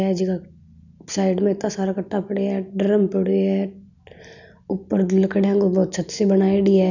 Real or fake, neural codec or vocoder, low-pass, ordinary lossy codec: real; none; 7.2 kHz; none